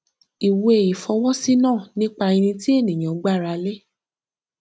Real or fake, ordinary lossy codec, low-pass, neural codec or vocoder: real; none; none; none